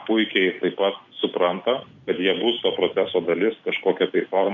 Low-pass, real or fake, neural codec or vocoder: 7.2 kHz; real; none